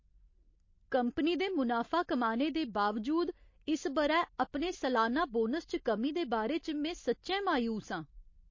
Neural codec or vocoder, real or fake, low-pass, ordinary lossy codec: none; real; 7.2 kHz; MP3, 32 kbps